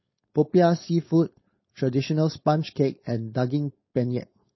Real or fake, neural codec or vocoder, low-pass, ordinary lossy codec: fake; codec, 16 kHz, 4.8 kbps, FACodec; 7.2 kHz; MP3, 24 kbps